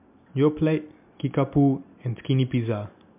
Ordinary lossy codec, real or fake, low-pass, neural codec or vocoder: MP3, 32 kbps; real; 3.6 kHz; none